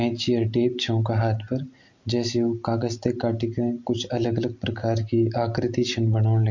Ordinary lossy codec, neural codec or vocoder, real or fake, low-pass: MP3, 48 kbps; none; real; 7.2 kHz